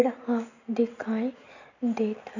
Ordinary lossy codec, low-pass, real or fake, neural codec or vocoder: none; 7.2 kHz; real; none